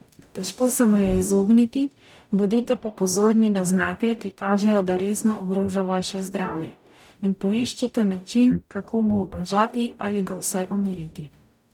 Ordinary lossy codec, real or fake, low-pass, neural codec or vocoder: none; fake; 19.8 kHz; codec, 44.1 kHz, 0.9 kbps, DAC